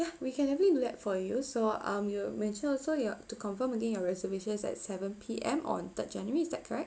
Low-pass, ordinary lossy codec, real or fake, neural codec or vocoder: none; none; real; none